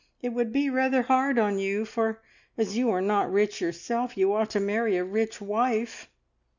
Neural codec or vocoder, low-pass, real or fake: none; 7.2 kHz; real